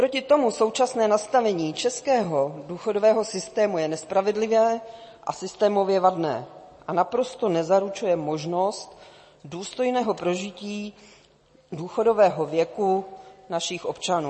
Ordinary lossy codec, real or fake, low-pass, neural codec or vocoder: MP3, 32 kbps; real; 10.8 kHz; none